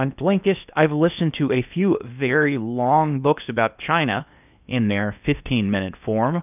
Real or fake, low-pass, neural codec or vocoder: fake; 3.6 kHz; codec, 16 kHz in and 24 kHz out, 0.8 kbps, FocalCodec, streaming, 65536 codes